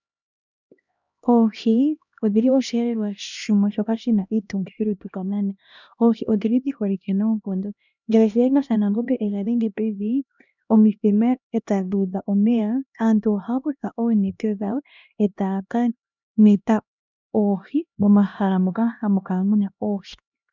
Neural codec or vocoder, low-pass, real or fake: codec, 16 kHz, 1 kbps, X-Codec, HuBERT features, trained on LibriSpeech; 7.2 kHz; fake